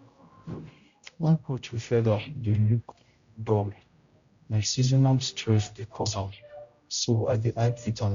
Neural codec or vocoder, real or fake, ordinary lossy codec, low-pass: codec, 16 kHz, 0.5 kbps, X-Codec, HuBERT features, trained on general audio; fake; Opus, 64 kbps; 7.2 kHz